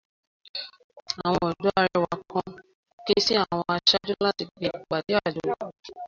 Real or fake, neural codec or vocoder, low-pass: real; none; 7.2 kHz